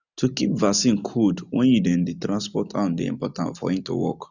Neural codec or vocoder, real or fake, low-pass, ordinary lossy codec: none; real; 7.2 kHz; none